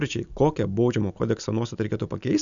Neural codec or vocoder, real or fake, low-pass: none; real; 7.2 kHz